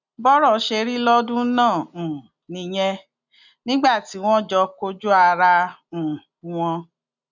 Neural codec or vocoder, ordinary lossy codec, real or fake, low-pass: none; none; real; 7.2 kHz